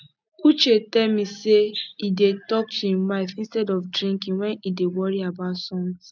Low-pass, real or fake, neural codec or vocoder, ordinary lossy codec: 7.2 kHz; real; none; none